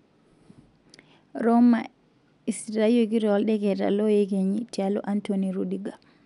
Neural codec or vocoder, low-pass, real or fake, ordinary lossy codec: none; 10.8 kHz; real; none